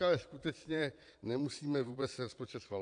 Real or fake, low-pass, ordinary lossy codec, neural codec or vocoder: fake; 9.9 kHz; AAC, 64 kbps; vocoder, 22.05 kHz, 80 mel bands, Vocos